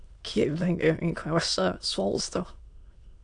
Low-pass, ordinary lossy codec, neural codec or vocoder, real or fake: 9.9 kHz; AAC, 64 kbps; autoencoder, 22.05 kHz, a latent of 192 numbers a frame, VITS, trained on many speakers; fake